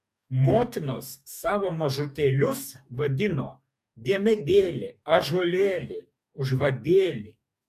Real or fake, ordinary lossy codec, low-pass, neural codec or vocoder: fake; MP3, 96 kbps; 14.4 kHz; codec, 44.1 kHz, 2.6 kbps, DAC